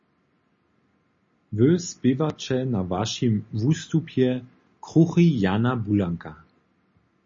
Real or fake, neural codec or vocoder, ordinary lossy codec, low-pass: real; none; MP3, 32 kbps; 7.2 kHz